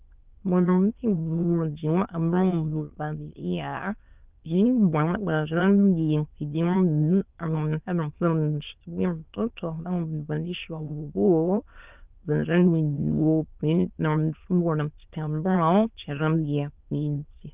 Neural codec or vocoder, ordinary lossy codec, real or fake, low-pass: autoencoder, 22.05 kHz, a latent of 192 numbers a frame, VITS, trained on many speakers; Opus, 24 kbps; fake; 3.6 kHz